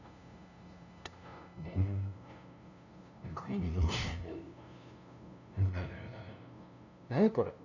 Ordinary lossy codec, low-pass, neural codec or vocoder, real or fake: none; 7.2 kHz; codec, 16 kHz, 0.5 kbps, FunCodec, trained on LibriTTS, 25 frames a second; fake